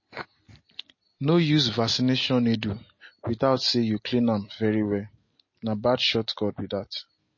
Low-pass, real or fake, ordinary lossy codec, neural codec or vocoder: 7.2 kHz; real; MP3, 32 kbps; none